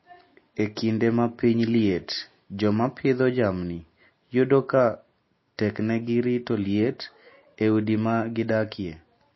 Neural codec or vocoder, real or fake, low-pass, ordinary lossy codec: none; real; 7.2 kHz; MP3, 24 kbps